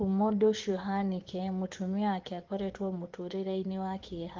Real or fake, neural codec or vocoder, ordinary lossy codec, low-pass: fake; vocoder, 24 kHz, 100 mel bands, Vocos; Opus, 16 kbps; 7.2 kHz